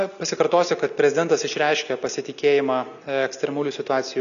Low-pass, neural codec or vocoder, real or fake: 7.2 kHz; none; real